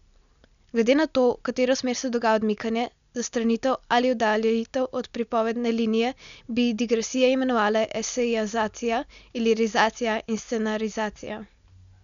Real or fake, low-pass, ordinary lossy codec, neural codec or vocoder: real; 7.2 kHz; none; none